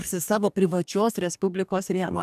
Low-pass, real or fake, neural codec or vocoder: 14.4 kHz; fake; codec, 44.1 kHz, 2.6 kbps, DAC